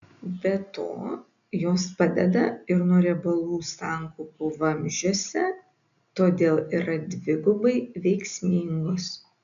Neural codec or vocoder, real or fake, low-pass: none; real; 7.2 kHz